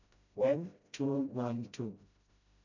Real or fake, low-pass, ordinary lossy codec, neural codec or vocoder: fake; 7.2 kHz; none; codec, 16 kHz, 0.5 kbps, FreqCodec, smaller model